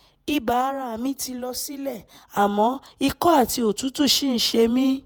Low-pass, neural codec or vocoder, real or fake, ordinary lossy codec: none; vocoder, 48 kHz, 128 mel bands, Vocos; fake; none